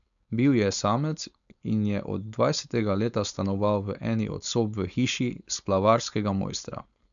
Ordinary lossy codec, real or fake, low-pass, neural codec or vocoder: none; fake; 7.2 kHz; codec, 16 kHz, 4.8 kbps, FACodec